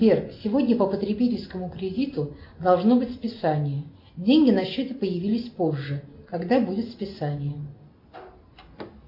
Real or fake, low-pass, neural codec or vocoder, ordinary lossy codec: real; 5.4 kHz; none; MP3, 32 kbps